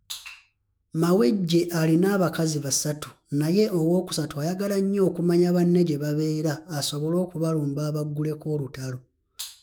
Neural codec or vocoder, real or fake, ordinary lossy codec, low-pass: autoencoder, 48 kHz, 128 numbers a frame, DAC-VAE, trained on Japanese speech; fake; none; none